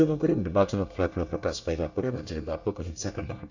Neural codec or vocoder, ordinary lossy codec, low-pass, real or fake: codec, 24 kHz, 1 kbps, SNAC; none; 7.2 kHz; fake